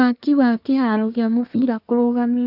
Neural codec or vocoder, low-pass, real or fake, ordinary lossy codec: codec, 24 kHz, 1 kbps, SNAC; 5.4 kHz; fake; none